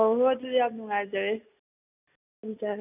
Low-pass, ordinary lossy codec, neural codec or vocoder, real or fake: 3.6 kHz; none; none; real